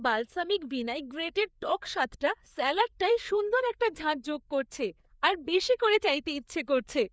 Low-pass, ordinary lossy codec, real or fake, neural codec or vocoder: none; none; fake; codec, 16 kHz, 4 kbps, FreqCodec, larger model